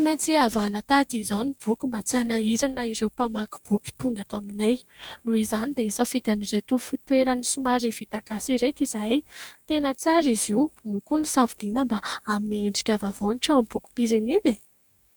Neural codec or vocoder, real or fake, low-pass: codec, 44.1 kHz, 2.6 kbps, DAC; fake; 19.8 kHz